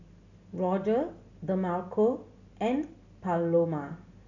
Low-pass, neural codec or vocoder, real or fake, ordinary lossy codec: 7.2 kHz; none; real; none